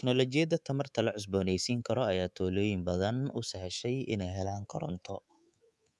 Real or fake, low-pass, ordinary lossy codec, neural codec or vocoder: fake; none; none; codec, 24 kHz, 3.1 kbps, DualCodec